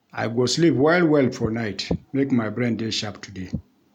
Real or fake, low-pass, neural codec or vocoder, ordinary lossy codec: real; 19.8 kHz; none; none